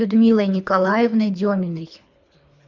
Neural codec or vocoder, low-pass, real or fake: codec, 24 kHz, 3 kbps, HILCodec; 7.2 kHz; fake